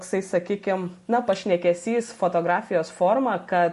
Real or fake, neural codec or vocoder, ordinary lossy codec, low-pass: real; none; MP3, 48 kbps; 14.4 kHz